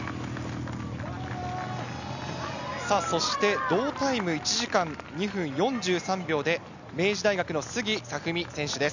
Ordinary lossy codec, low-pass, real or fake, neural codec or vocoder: none; 7.2 kHz; real; none